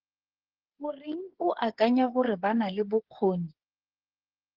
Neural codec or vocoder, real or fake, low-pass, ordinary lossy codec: codec, 16 kHz, 8 kbps, FreqCodec, smaller model; fake; 5.4 kHz; Opus, 16 kbps